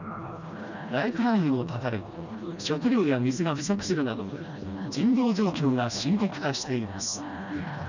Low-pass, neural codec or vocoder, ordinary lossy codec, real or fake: 7.2 kHz; codec, 16 kHz, 1 kbps, FreqCodec, smaller model; none; fake